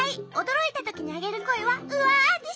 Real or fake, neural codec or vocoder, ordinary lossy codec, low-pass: real; none; none; none